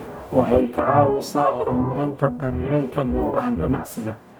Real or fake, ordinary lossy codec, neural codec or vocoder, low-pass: fake; none; codec, 44.1 kHz, 0.9 kbps, DAC; none